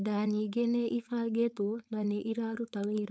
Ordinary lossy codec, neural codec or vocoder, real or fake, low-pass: none; codec, 16 kHz, 4.8 kbps, FACodec; fake; none